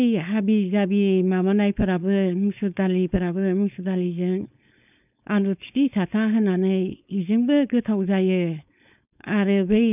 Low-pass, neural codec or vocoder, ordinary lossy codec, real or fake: 3.6 kHz; codec, 16 kHz, 4.8 kbps, FACodec; none; fake